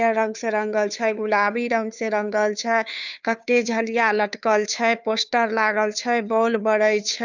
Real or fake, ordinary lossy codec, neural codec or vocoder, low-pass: fake; none; codec, 16 kHz, 2 kbps, FunCodec, trained on Chinese and English, 25 frames a second; 7.2 kHz